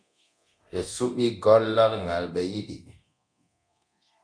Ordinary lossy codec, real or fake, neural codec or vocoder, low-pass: MP3, 96 kbps; fake; codec, 24 kHz, 0.9 kbps, DualCodec; 9.9 kHz